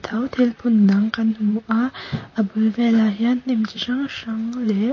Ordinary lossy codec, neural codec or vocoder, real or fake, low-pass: MP3, 32 kbps; vocoder, 44.1 kHz, 128 mel bands, Pupu-Vocoder; fake; 7.2 kHz